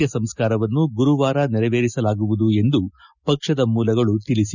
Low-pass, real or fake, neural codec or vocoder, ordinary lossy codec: 7.2 kHz; real; none; none